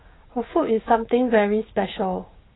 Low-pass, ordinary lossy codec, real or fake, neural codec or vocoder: 7.2 kHz; AAC, 16 kbps; fake; codec, 16 kHz, 6 kbps, DAC